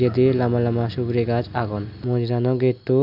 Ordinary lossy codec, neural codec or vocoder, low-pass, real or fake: none; none; 5.4 kHz; real